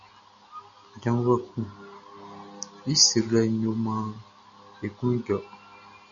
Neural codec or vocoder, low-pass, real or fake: none; 7.2 kHz; real